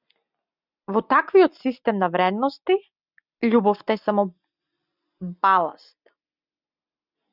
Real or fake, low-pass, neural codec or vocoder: real; 5.4 kHz; none